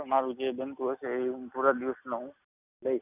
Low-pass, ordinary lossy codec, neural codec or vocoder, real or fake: 3.6 kHz; none; none; real